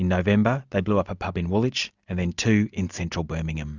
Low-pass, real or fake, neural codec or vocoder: 7.2 kHz; real; none